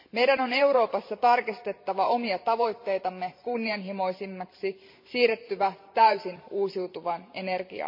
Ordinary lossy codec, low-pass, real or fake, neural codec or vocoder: MP3, 32 kbps; 5.4 kHz; fake; vocoder, 44.1 kHz, 128 mel bands every 512 samples, BigVGAN v2